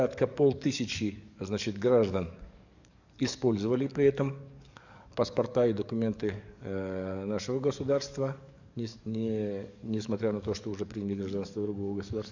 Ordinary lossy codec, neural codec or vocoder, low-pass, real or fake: none; codec, 44.1 kHz, 7.8 kbps, DAC; 7.2 kHz; fake